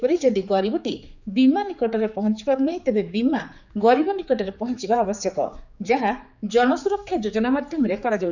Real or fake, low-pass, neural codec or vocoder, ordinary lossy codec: fake; 7.2 kHz; codec, 16 kHz, 4 kbps, X-Codec, HuBERT features, trained on general audio; none